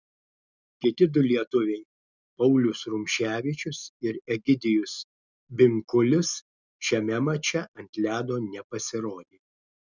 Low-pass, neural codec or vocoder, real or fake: 7.2 kHz; none; real